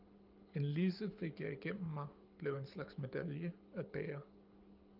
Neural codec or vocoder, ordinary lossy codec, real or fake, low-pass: codec, 24 kHz, 6 kbps, HILCodec; Opus, 24 kbps; fake; 5.4 kHz